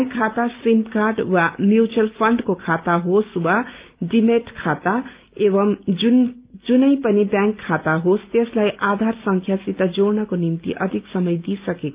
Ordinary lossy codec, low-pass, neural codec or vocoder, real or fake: Opus, 32 kbps; 3.6 kHz; none; real